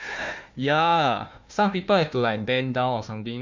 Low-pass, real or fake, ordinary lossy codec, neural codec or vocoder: 7.2 kHz; fake; MP3, 64 kbps; codec, 16 kHz, 1 kbps, FunCodec, trained on Chinese and English, 50 frames a second